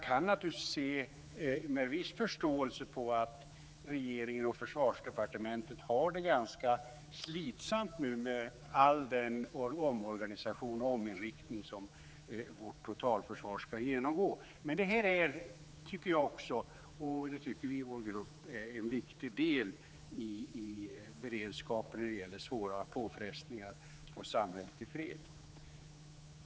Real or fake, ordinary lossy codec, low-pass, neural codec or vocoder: fake; none; none; codec, 16 kHz, 4 kbps, X-Codec, HuBERT features, trained on general audio